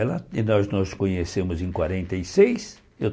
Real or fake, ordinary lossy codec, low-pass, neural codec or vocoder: real; none; none; none